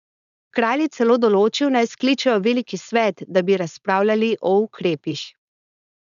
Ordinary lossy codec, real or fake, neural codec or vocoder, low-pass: none; fake; codec, 16 kHz, 4.8 kbps, FACodec; 7.2 kHz